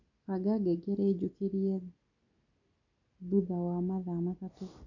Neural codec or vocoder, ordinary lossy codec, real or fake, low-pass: none; none; real; 7.2 kHz